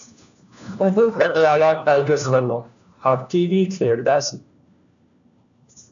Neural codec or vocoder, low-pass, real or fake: codec, 16 kHz, 1 kbps, FunCodec, trained on LibriTTS, 50 frames a second; 7.2 kHz; fake